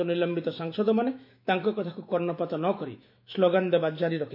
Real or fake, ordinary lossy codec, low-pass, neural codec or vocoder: fake; MP3, 32 kbps; 5.4 kHz; autoencoder, 48 kHz, 128 numbers a frame, DAC-VAE, trained on Japanese speech